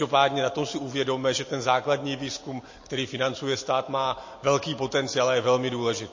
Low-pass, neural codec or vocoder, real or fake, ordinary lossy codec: 7.2 kHz; none; real; MP3, 32 kbps